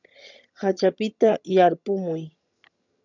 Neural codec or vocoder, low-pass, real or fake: vocoder, 22.05 kHz, 80 mel bands, HiFi-GAN; 7.2 kHz; fake